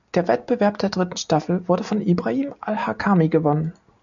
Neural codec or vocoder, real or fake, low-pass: none; real; 7.2 kHz